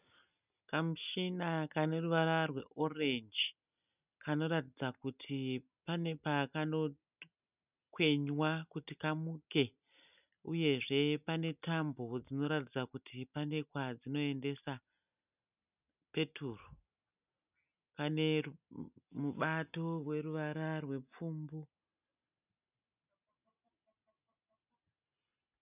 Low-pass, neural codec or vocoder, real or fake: 3.6 kHz; none; real